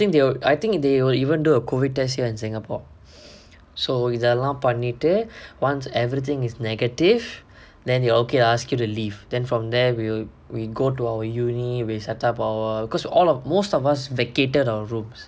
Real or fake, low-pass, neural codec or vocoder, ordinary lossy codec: real; none; none; none